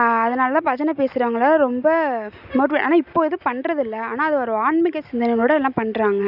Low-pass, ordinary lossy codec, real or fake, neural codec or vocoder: 5.4 kHz; none; real; none